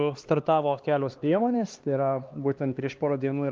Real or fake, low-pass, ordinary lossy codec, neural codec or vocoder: fake; 7.2 kHz; Opus, 32 kbps; codec, 16 kHz, 2 kbps, X-Codec, HuBERT features, trained on LibriSpeech